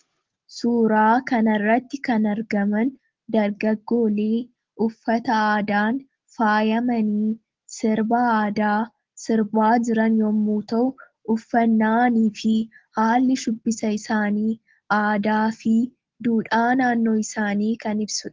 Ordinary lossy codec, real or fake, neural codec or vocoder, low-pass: Opus, 16 kbps; real; none; 7.2 kHz